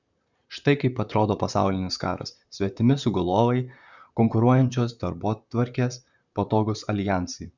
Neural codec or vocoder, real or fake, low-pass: vocoder, 44.1 kHz, 128 mel bands, Pupu-Vocoder; fake; 7.2 kHz